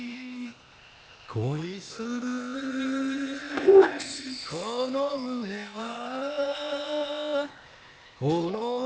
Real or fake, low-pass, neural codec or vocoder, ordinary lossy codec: fake; none; codec, 16 kHz, 0.8 kbps, ZipCodec; none